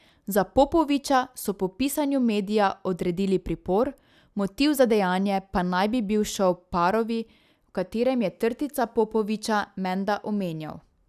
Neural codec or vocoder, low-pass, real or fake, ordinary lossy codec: none; 14.4 kHz; real; none